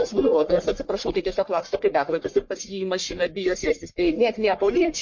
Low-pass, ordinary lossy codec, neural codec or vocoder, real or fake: 7.2 kHz; MP3, 48 kbps; codec, 44.1 kHz, 1.7 kbps, Pupu-Codec; fake